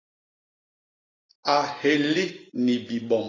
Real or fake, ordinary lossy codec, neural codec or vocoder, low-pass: fake; AAC, 32 kbps; vocoder, 44.1 kHz, 128 mel bands every 256 samples, BigVGAN v2; 7.2 kHz